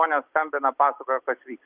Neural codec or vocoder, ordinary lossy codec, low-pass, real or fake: none; Opus, 32 kbps; 3.6 kHz; real